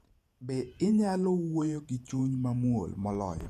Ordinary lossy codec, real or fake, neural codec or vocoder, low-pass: none; fake; vocoder, 48 kHz, 128 mel bands, Vocos; 14.4 kHz